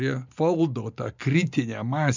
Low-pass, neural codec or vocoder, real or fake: 7.2 kHz; none; real